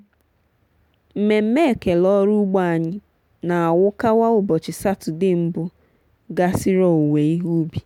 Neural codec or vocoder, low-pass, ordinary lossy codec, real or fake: none; 19.8 kHz; none; real